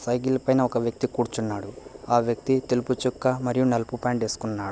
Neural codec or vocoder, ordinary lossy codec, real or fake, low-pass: none; none; real; none